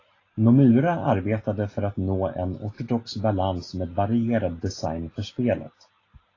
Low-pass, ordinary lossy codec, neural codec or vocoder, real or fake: 7.2 kHz; AAC, 32 kbps; none; real